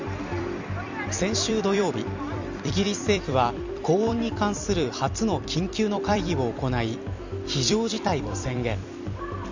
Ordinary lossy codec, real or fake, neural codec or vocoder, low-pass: Opus, 64 kbps; fake; vocoder, 44.1 kHz, 128 mel bands every 256 samples, BigVGAN v2; 7.2 kHz